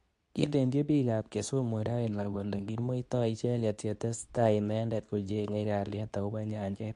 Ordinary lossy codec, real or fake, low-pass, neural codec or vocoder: AAC, 64 kbps; fake; 10.8 kHz; codec, 24 kHz, 0.9 kbps, WavTokenizer, medium speech release version 2